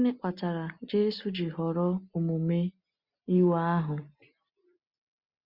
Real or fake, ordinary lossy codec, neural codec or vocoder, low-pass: real; Opus, 64 kbps; none; 5.4 kHz